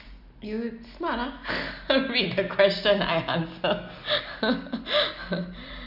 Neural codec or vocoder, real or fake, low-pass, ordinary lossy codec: none; real; 5.4 kHz; none